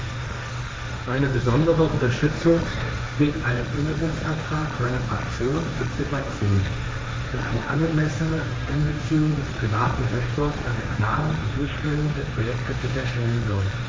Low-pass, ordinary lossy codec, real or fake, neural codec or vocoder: none; none; fake; codec, 16 kHz, 1.1 kbps, Voila-Tokenizer